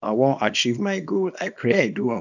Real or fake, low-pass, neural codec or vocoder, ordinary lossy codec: fake; 7.2 kHz; codec, 24 kHz, 0.9 kbps, WavTokenizer, small release; none